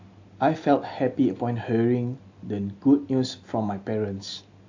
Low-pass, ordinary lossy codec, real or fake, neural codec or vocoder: 7.2 kHz; AAC, 48 kbps; real; none